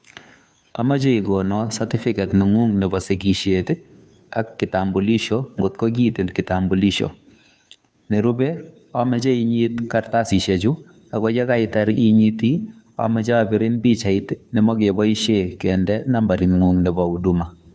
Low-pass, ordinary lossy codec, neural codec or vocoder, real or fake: none; none; codec, 16 kHz, 2 kbps, FunCodec, trained on Chinese and English, 25 frames a second; fake